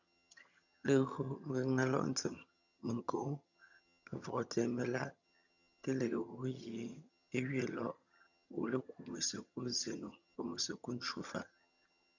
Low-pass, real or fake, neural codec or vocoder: 7.2 kHz; fake; vocoder, 22.05 kHz, 80 mel bands, HiFi-GAN